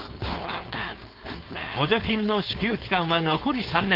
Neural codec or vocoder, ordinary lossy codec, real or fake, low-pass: codec, 16 kHz, 4.8 kbps, FACodec; Opus, 24 kbps; fake; 5.4 kHz